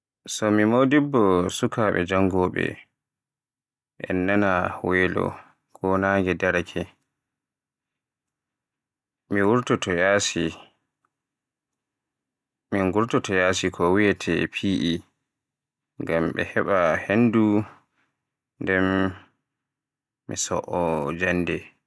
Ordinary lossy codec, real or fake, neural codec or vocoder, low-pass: none; real; none; none